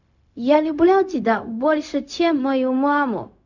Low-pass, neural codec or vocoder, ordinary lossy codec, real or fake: 7.2 kHz; codec, 16 kHz, 0.4 kbps, LongCat-Audio-Codec; none; fake